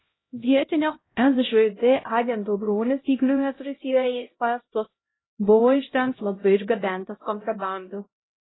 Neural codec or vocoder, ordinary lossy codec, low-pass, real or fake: codec, 16 kHz, 0.5 kbps, X-Codec, HuBERT features, trained on LibriSpeech; AAC, 16 kbps; 7.2 kHz; fake